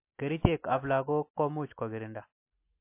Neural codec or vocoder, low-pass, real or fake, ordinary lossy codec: none; 3.6 kHz; real; MP3, 24 kbps